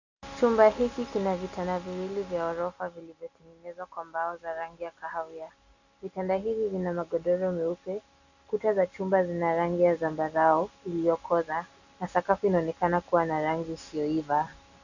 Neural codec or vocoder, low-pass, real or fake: none; 7.2 kHz; real